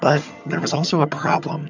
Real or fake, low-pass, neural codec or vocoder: fake; 7.2 kHz; vocoder, 22.05 kHz, 80 mel bands, HiFi-GAN